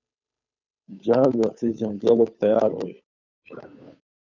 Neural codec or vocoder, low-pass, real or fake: codec, 16 kHz, 2 kbps, FunCodec, trained on Chinese and English, 25 frames a second; 7.2 kHz; fake